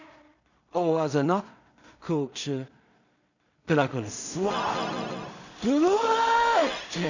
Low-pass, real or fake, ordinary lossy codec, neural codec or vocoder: 7.2 kHz; fake; none; codec, 16 kHz in and 24 kHz out, 0.4 kbps, LongCat-Audio-Codec, two codebook decoder